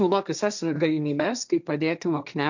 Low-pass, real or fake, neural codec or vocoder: 7.2 kHz; fake; codec, 16 kHz, 1.1 kbps, Voila-Tokenizer